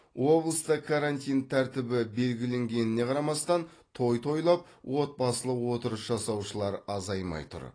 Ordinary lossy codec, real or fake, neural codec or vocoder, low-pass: AAC, 32 kbps; real; none; 9.9 kHz